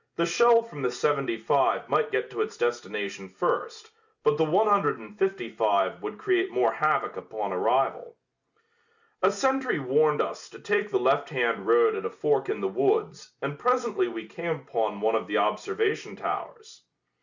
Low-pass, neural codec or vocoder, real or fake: 7.2 kHz; none; real